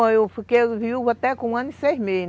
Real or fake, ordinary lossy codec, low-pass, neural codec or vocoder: real; none; none; none